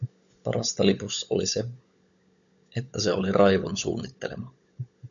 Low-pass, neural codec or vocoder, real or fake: 7.2 kHz; codec, 16 kHz, 16 kbps, FunCodec, trained on LibriTTS, 50 frames a second; fake